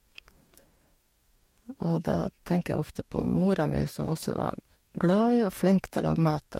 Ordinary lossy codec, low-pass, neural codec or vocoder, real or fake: MP3, 64 kbps; 19.8 kHz; codec, 44.1 kHz, 2.6 kbps, DAC; fake